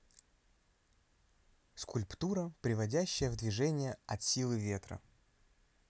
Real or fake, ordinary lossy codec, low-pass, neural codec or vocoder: real; none; none; none